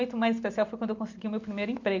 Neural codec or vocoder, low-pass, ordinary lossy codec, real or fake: none; 7.2 kHz; MP3, 64 kbps; real